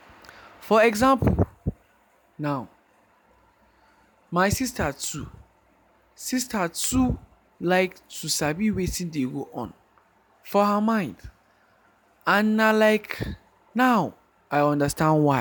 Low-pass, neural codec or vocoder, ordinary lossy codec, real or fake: none; none; none; real